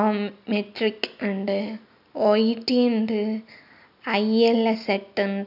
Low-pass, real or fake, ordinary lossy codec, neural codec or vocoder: 5.4 kHz; real; none; none